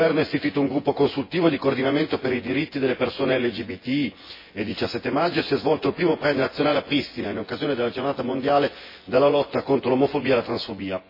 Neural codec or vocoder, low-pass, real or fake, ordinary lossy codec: vocoder, 24 kHz, 100 mel bands, Vocos; 5.4 kHz; fake; MP3, 24 kbps